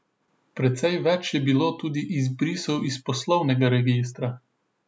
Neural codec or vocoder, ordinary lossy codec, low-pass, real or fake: none; none; none; real